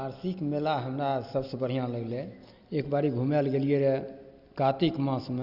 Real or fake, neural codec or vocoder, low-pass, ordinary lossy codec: real; none; 5.4 kHz; Opus, 64 kbps